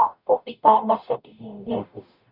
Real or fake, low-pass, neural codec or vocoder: fake; 5.4 kHz; codec, 44.1 kHz, 0.9 kbps, DAC